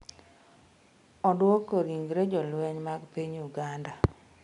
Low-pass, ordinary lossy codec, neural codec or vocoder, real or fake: 10.8 kHz; MP3, 96 kbps; none; real